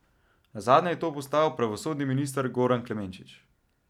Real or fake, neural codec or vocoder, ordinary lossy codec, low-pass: real; none; none; 19.8 kHz